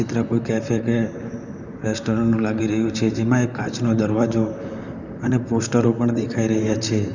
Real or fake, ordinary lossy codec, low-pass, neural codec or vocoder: fake; none; 7.2 kHz; vocoder, 44.1 kHz, 128 mel bands, Pupu-Vocoder